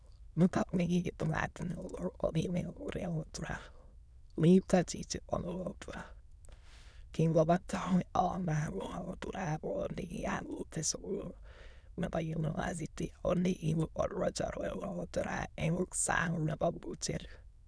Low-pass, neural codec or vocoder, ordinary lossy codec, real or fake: none; autoencoder, 22.05 kHz, a latent of 192 numbers a frame, VITS, trained on many speakers; none; fake